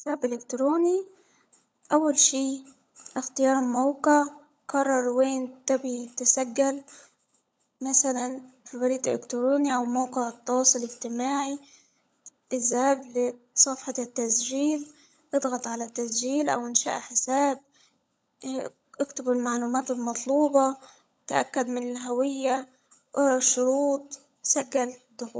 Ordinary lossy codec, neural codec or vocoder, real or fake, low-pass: none; codec, 16 kHz, 16 kbps, FunCodec, trained on LibriTTS, 50 frames a second; fake; none